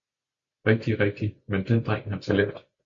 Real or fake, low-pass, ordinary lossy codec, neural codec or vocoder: real; 7.2 kHz; MP3, 48 kbps; none